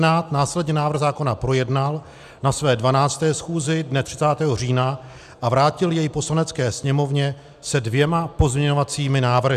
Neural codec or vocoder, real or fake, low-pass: none; real; 14.4 kHz